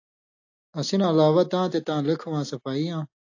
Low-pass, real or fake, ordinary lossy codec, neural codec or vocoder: 7.2 kHz; real; AAC, 48 kbps; none